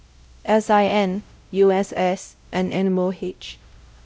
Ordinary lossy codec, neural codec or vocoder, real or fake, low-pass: none; codec, 16 kHz, 0.5 kbps, X-Codec, WavLM features, trained on Multilingual LibriSpeech; fake; none